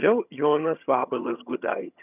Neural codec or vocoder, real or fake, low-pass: vocoder, 22.05 kHz, 80 mel bands, HiFi-GAN; fake; 3.6 kHz